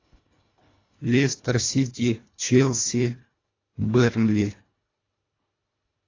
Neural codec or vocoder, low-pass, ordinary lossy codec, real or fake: codec, 24 kHz, 1.5 kbps, HILCodec; 7.2 kHz; AAC, 32 kbps; fake